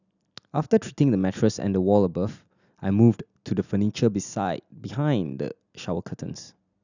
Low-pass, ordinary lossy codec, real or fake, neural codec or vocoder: 7.2 kHz; none; real; none